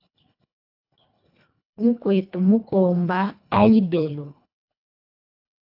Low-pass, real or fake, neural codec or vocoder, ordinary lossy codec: 5.4 kHz; fake; codec, 24 kHz, 1.5 kbps, HILCodec; AAC, 48 kbps